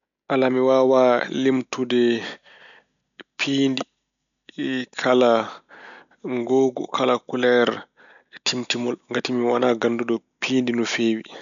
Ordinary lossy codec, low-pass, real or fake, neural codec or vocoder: none; 7.2 kHz; real; none